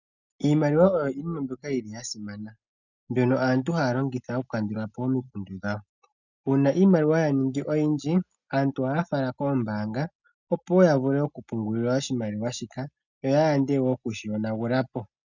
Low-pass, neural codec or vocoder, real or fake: 7.2 kHz; none; real